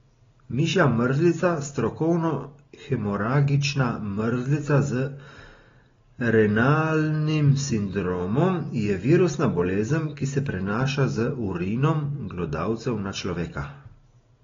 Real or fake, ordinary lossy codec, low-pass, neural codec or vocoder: real; AAC, 24 kbps; 7.2 kHz; none